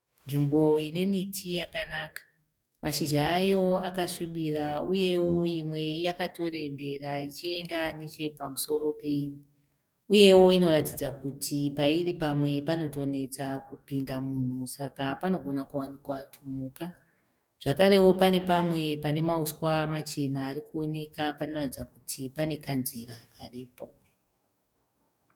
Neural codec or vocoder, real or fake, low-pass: codec, 44.1 kHz, 2.6 kbps, DAC; fake; 19.8 kHz